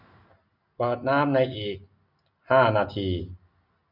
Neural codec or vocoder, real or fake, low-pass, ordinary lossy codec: none; real; 5.4 kHz; none